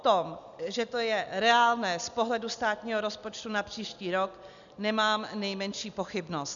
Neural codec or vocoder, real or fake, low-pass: none; real; 7.2 kHz